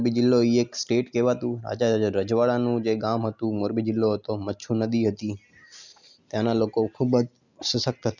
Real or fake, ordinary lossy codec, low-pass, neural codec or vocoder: real; none; 7.2 kHz; none